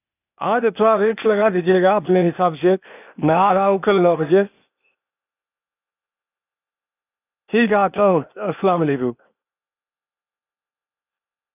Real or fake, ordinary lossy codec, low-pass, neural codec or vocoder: fake; none; 3.6 kHz; codec, 16 kHz, 0.8 kbps, ZipCodec